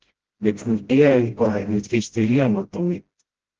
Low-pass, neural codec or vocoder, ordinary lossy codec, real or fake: 7.2 kHz; codec, 16 kHz, 0.5 kbps, FreqCodec, smaller model; Opus, 16 kbps; fake